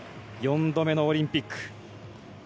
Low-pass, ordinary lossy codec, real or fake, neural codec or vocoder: none; none; real; none